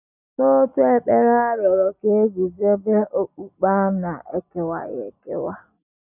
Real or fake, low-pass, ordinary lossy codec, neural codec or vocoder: real; 3.6 kHz; none; none